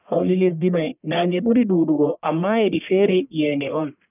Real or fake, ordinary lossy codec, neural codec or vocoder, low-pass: fake; none; codec, 44.1 kHz, 1.7 kbps, Pupu-Codec; 3.6 kHz